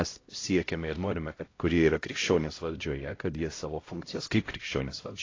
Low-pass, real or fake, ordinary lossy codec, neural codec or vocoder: 7.2 kHz; fake; AAC, 32 kbps; codec, 16 kHz, 0.5 kbps, X-Codec, HuBERT features, trained on LibriSpeech